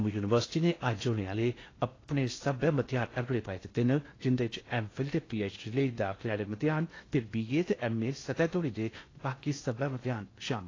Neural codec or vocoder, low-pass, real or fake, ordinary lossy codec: codec, 16 kHz in and 24 kHz out, 0.6 kbps, FocalCodec, streaming, 4096 codes; 7.2 kHz; fake; AAC, 32 kbps